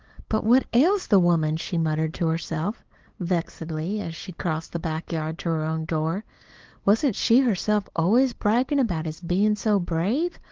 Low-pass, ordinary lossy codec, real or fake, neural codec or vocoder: 7.2 kHz; Opus, 16 kbps; fake; codec, 16 kHz, 8 kbps, FunCodec, trained on LibriTTS, 25 frames a second